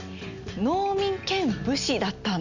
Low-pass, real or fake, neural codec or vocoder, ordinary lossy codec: 7.2 kHz; real; none; none